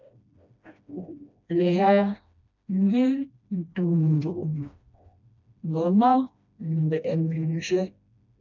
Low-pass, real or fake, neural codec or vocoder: 7.2 kHz; fake; codec, 16 kHz, 1 kbps, FreqCodec, smaller model